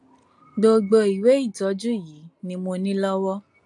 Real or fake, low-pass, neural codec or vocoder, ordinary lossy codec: real; 10.8 kHz; none; AAC, 64 kbps